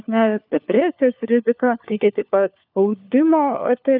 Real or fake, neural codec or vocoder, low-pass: fake; codec, 16 kHz, 4 kbps, FunCodec, trained on LibriTTS, 50 frames a second; 5.4 kHz